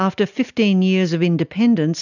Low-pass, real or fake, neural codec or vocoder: 7.2 kHz; real; none